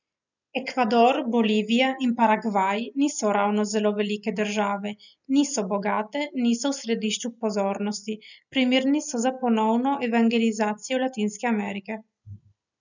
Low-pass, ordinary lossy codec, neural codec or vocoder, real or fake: 7.2 kHz; none; none; real